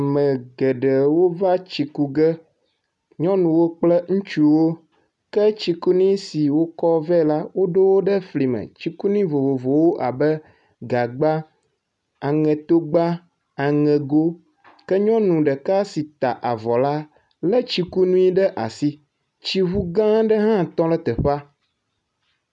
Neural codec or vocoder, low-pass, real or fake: none; 10.8 kHz; real